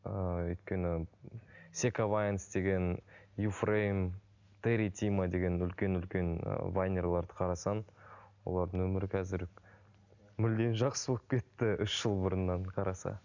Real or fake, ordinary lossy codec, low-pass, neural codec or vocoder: real; none; 7.2 kHz; none